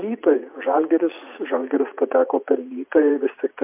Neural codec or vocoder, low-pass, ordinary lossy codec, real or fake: vocoder, 44.1 kHz, 80 mel bands, Vocos; 3.6 kHz; MP3, 32 kbps; fake